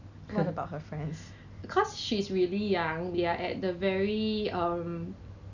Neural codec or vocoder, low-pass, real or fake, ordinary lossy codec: none; 7.2 kHz; real; none